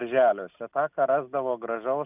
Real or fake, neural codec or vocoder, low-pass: real; none; 3.6 kHz